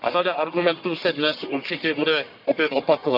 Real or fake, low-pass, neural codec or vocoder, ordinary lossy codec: fake; 5.4 kHz; codec, 44.1 kHz, 1.7 kbps, Pupu-Codec; none